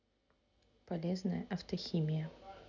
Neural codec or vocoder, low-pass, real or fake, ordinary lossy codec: none; 7.2 kHz; real; none